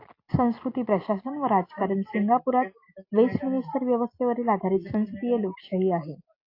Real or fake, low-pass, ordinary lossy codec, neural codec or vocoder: real; 5.4 kHz; AAC, 32 kbps; none